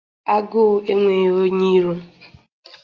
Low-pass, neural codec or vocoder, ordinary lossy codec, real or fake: 7.2 kHz; none; Opus, 24 kbps; real